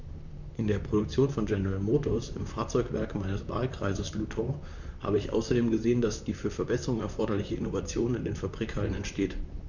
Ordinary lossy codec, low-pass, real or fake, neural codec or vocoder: none; 7.2 kHz; fake; vocoder, 44.1 kHz, 128 mel bands, Pupu-Vocoder